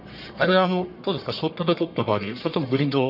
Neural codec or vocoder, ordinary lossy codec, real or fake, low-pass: codec, 44.1 kHz, 1.7 kbps, Pupu-Codec; none; fake; 5.4 kHz